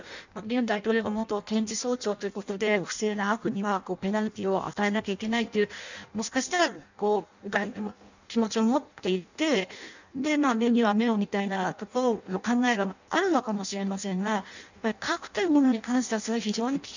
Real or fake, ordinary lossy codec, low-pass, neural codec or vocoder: fake; none; 7.2 kHz; codec, 16 kHz in and 24 kHz out, 0.6 kbps, FireRedTTS-2 codec